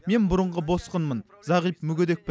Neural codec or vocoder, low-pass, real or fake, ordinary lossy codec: none; none; real; none